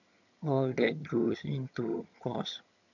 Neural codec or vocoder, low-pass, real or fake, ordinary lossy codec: vocoder, 22.05 kHz, 80 mel bands, HiFi-GAN; 7.2 kHz; fake; none